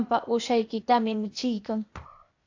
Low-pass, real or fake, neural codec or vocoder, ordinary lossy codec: 7.2 kHz; fake; codec, 16 kHz, 0.8 kbps, ZipCodec; AAC, 48 kbps